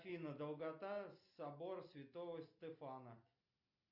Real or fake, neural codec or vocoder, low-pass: real; none; 5.4 kHz